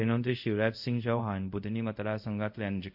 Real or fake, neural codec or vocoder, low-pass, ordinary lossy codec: fake; codec, 24 kHz, 0.5 kbps, DualCodec; 5.4 kHz; AAC, 48 kbps